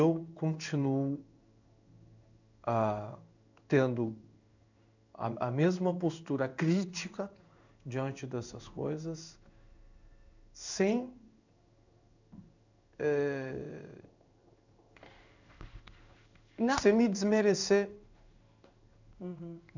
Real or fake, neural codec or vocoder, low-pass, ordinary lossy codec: fake; codec, 16 kHz in and 24 kHz out, 1 kbps, XY-Tokenizer; 7.2 kHz; none